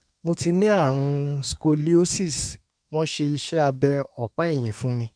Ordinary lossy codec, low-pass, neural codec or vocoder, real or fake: none; 9.9 kHz; codec, 24 kHz, 1 kbps, SNAC; fake